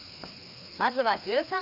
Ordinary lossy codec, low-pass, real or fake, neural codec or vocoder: none; 5.4 kHz; fake; codec, 16 kHz, 2 kbps, FreqCodec, larger model